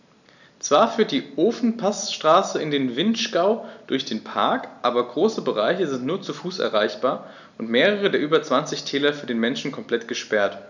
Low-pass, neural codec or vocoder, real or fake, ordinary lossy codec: 7.2 kHz; none; real; none